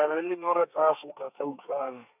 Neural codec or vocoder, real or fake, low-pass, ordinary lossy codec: codec, 32 kHz, 1.9 kbps, SNAC; fake; 3.6 kHz; none